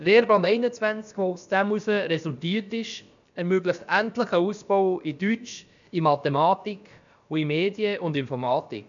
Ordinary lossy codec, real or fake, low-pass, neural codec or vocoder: MP3, 96 kbps; fake; 7.2 kHz; codec, 16 kHz, about 1 kbps, DyCAST, with the encoder's durations